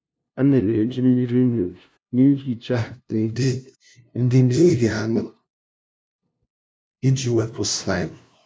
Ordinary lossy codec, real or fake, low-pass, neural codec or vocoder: none; fake; none; codec, 16 kHz, 0.5 kbps, FunCodec, trained on LibriTTS, 25 frames a second